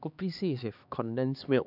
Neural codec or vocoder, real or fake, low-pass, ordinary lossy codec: codec, 16 kHz, 2 kbps, X-Codec, HuBERT features, trained on LibriSpeech; fake; 5.4 kHz; none